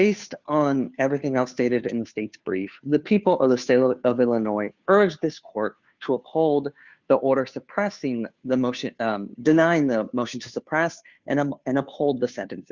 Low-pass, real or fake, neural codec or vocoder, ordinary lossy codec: 7.2 kHz; fake; codec, 16 kHz, 2 kbps, FunCodec, trained on Chinese and English, 25 frames a second; Opus, 64 kbps